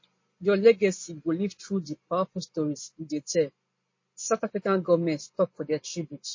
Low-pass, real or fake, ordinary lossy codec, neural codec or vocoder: 7.2 kHz; real; MP3, 32 kbps; none